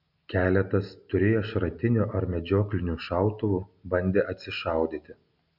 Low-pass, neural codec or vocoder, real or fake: 5.4 kHz; none; real